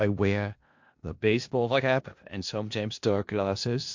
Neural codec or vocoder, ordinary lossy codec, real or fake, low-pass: codec, 16 kHz in and 24 kHz out, 0.4 kbps, LongCat-Audio-Codec, four codebook decoder; MP3, 48 kbps; fake; 7.2 kHz